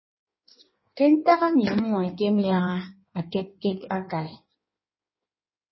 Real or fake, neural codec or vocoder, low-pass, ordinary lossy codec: fake; codec, 16 kHz in and 24 kHz out, 1.1 kbps, FireRedTTS-2 codec; 7.2 kHz; MP3, 24 kbps